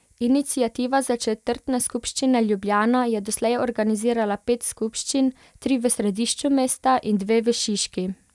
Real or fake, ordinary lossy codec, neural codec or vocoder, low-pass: real; none; none; none